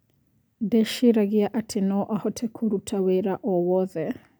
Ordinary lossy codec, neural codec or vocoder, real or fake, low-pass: none; none; real; none